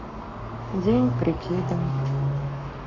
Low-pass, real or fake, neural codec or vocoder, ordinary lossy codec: 7.2 kHz; fake; codec, 44.1 kHz, 7.8 kbps, Pupu-Codec; none